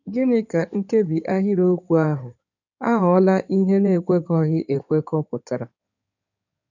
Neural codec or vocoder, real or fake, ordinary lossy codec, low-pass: codec, 16 kHz in and 24 kHz out, 2.2 kbps, FireRedTTS-2 codec; fake; none; 7.2 kHz